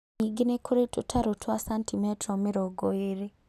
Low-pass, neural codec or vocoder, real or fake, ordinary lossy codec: 14.4 kHz; none; real; none